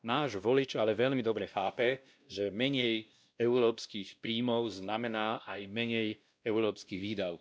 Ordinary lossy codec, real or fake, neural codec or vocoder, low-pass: none; fake; codec, 16 kHz, 1 kbps, X-Codec, WavLM features, trained on Multilingual LibriSpeech; none